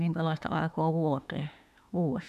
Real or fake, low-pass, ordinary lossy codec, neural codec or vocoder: fake; 14.4 kHz; none; autoencoder, 48 kHz, 32 numbers a frame, DAC-VAE, trained on Japanese speech